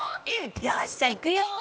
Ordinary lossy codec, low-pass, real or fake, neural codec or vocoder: none; none; fake; codec, 16 kHz, 0.8 kbps, ZipCodec